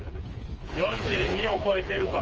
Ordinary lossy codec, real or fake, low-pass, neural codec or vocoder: Opus, 24 kbps; fake; 7.2 kHz; codec, 16 kHz, 4 kbps, FreqCodec, smaller model